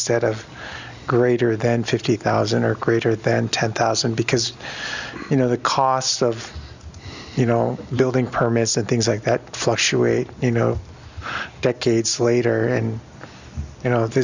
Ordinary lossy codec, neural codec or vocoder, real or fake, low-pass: Opus, 64 kbps; none; real; 7.2 kHz